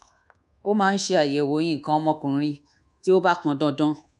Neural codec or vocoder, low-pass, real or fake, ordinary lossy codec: codec, 24 kHz, 1.2 kbps, DualCodec; 10.8 kHz; fake; none